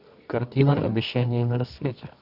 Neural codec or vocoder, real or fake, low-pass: codec, 24 kHz, 0.9 kbps, WavTokenizer, medium music audio release; fake; 5.4 kHz